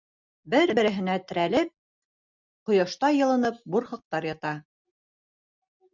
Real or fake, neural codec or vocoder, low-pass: real; none; 7.2 kHz